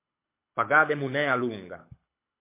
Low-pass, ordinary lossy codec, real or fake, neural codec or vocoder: 3.6 kHz; MP3, 24 kbps; fake; codec, 24 kHz, 6 kbps, HILCodec